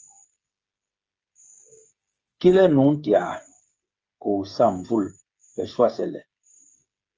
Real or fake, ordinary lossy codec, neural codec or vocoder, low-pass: fake; Opus, 24 kbps; codec, 16 kHz, 8 kbps, FreqCodec, smaller model; 7.2 kHz